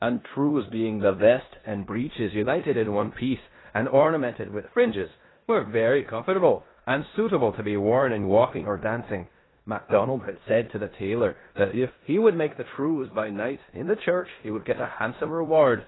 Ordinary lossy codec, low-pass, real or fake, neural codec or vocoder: AAC, 16 kbps; 7.2 kHz; fake; codec, 16 kHz in and 24 kHz out, 0.9 kbps, LongCat-Audio-Codec, four codebook decoder